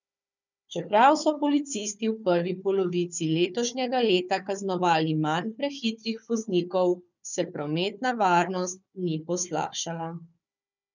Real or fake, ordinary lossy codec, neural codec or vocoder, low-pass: fake; none; codec, 16 kHz, 4 kbps, FunCodec, trained on Chinese and English, 50 frames a second; 7.2 kHz